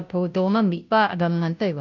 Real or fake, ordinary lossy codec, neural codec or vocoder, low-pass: fake; none; codec, 16 kHz, 0.5 kbps, FunCodec, trained on Chinese and English, 25 frames a second; 7.2 kHz